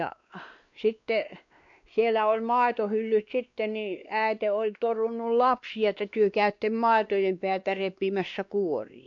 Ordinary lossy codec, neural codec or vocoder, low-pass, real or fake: none; codec, 16 kHz, 2 kbps, X-Codec, WavLM features, trained on Multilingual LibriSpeech; 7.2 kHz; fake